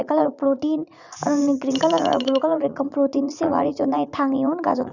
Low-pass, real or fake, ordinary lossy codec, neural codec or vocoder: 7.2 kHz; fake; none; vocoder, 44.1 kHz, 80 mel bands, Vocos